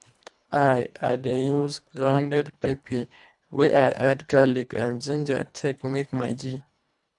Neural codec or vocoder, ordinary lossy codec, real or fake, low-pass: codec, 24 kHz, 1.5 kbps, HILCodec; none; fake; 10.8 kHz